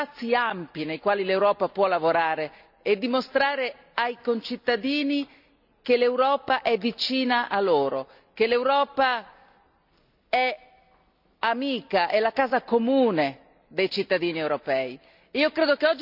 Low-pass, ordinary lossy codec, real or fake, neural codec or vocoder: 5.4 kHz; none; real; none